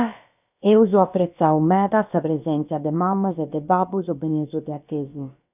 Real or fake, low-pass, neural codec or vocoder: fake; 3.6 kHz; codec, 16 kHz, about 1 kbps, DyCAST, with the encoder's durations